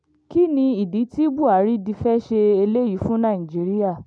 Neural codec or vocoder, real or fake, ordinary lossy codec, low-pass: none; real; none; 9.9 kHz